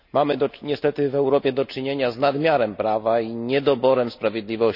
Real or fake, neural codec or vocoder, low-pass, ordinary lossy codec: real; none; 5.4 kHz; none